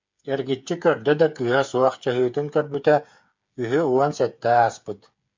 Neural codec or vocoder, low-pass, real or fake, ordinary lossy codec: codec, 16 kHz, 8 kbps, FreqCodec, smaller model; 7.2 kHz; fake; MP3, 64 kbps